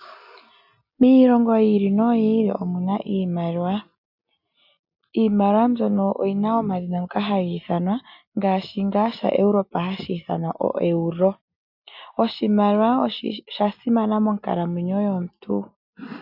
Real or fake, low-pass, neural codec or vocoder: real; 5.4 kHz; none